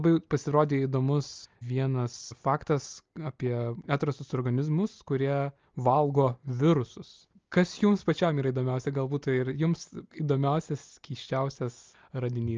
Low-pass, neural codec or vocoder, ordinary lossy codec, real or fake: 7.2 kHz; none; Opus, 24 kbps; real